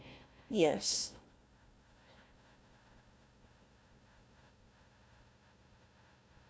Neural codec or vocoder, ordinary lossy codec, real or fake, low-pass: codec, 16 kHz, 1 kbps, FunCodec, trained on Chinese and English, 50 frames a second; none; fake; none